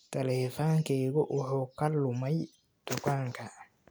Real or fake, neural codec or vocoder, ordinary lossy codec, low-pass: real; none; none; none